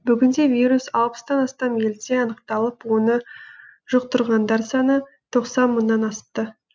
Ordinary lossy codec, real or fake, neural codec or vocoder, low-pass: none; real; none; none